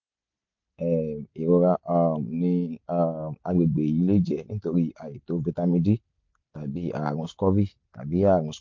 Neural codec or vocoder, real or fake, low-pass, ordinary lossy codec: none; real; 7.2 kHz; MP3, 48 kbps